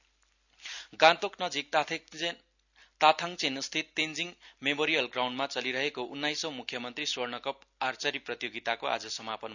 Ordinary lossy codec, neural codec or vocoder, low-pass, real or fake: none; none; 7.2 kHz; real